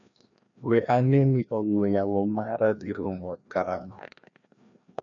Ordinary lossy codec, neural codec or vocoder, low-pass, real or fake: MP3, 96 kbps; codec, 16 kHz, 1 kbps, FreqCodec, larger model; 7.2 kHz; fake